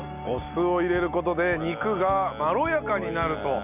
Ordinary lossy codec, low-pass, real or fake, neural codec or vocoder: none; 3.6 kHz; real; none